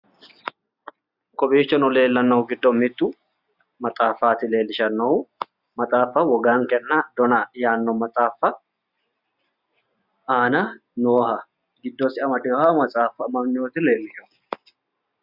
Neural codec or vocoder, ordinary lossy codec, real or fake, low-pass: none; Opus, 64 kbps; real; 5.4 kHz